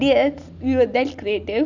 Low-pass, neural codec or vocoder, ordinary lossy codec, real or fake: 7.2 kHz; none; none; real